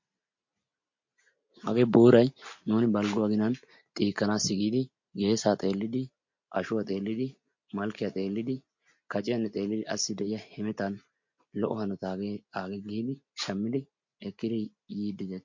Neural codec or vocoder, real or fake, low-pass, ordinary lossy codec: none; real; 7.2 kHz; MP3, 48 kbps